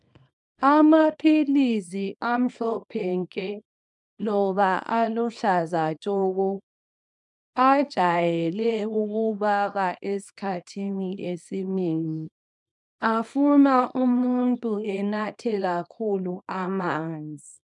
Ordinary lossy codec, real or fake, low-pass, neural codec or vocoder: MP3, 96 kbps; fake; 10.8 kHz; codec, 24 kHz, 0.9 kbps, WavTokenizer, small release